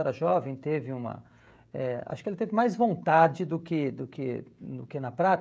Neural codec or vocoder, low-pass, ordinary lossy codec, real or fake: codec, 16 kHz, 16 kbps, FreqCodec, smaller model; none; none; fake